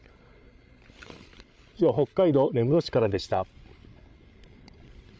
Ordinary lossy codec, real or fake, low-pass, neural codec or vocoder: none; fake; none; codec, 16 kHz, 16 kbps, FreqCodec, larger model